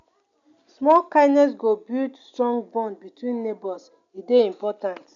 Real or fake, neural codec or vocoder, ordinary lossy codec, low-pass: real; none; none; 7.2 kHz